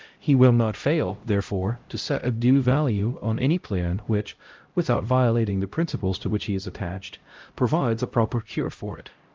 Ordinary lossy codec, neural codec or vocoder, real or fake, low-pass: Opus, 32 kbps; codec, 16 kHz, 0.5 kbps, X-Codec, HuBERT features, trained on LibriSpeech; fake; 7.2 kHz